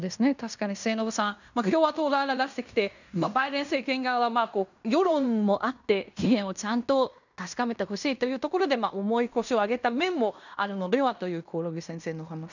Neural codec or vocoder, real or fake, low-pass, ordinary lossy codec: codec, 16 kHz in and 24 kHz out, 0.9 kbps, LongCat-Audio-Codec, fine tuned four codebook decoder; fake; 7.2 kHz; none